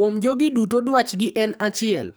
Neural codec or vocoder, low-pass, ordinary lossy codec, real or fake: codec, 44.1 kHz, 2.6 kbps, SNAC; none; none; fake